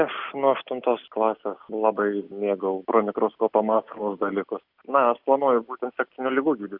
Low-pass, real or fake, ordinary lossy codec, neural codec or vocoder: 5.4 kHz; real; Opus, 64 kbps; none